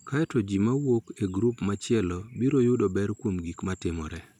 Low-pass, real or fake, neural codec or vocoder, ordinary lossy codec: 19.8 kHz; real; none; none